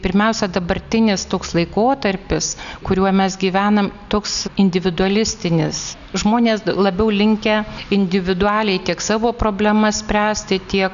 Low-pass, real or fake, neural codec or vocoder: 7.2 kHz; real; none